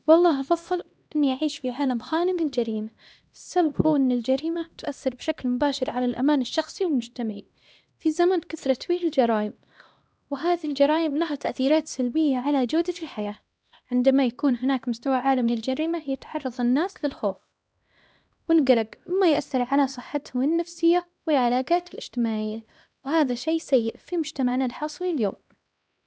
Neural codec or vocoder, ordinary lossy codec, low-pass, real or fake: codec, 16 kHz, 1 kbps, X-Codec, HuBERT features, trained on LibriSpeech; none; none; fake